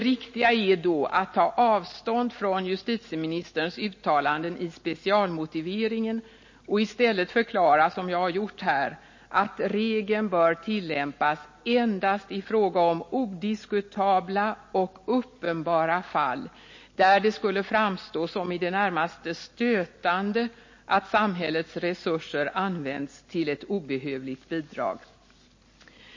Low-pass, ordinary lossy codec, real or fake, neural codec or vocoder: 7.2 kHz; MP3, 32 kbps; real; none